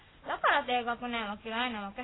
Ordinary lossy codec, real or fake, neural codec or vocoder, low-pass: AAC, 16 kbps; real; none; 7.2 kHz